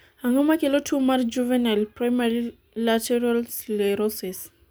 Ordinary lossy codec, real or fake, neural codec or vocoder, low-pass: none; real; none; none